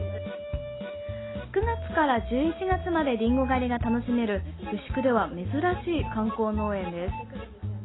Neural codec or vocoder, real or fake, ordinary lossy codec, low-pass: none; real; AAC, 16 kbps; 7.2 kHz